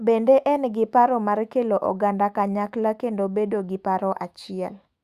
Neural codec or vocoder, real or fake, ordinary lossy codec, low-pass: autoencoder, 48 kHz, 128 numbers a frame, DAC-VAE, trained on Japanese speech; fake; Opus, 64 kbps; 14.4 kHz